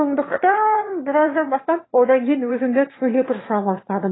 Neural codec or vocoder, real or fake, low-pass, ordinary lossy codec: autoencoder, 22.05 kHz, a latent of 192 numbers a frame, VITS, trained on one speaker; fake; 7.2 kHz; AAC, 16 kbps